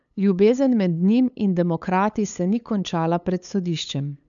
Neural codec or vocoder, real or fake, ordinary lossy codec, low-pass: codec, 16 kHz, 8 kbps, FunCodec, trained on LibriTTS, 25 frames a second; fake; none; 7.2 kHz